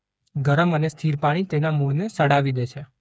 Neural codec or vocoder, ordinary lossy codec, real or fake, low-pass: codec, 16 kHz, 4 kbps, FreqCodec, smaller model; none; fake; none